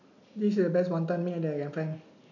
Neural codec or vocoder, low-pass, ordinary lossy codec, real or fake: none; 7.2 kHz; none; real